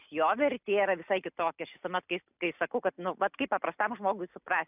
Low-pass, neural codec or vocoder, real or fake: 3.6 kHz; none; real